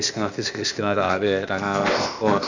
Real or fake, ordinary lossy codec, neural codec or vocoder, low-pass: fake; none; codec, 16 kHz, 0.8 kbps, ZipCodec; 7.2 kHz